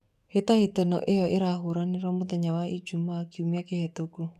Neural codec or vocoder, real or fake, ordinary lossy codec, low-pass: codec, 44.1 kHz, 7.8 kbps, DAC; fake; none; 14.4 kHz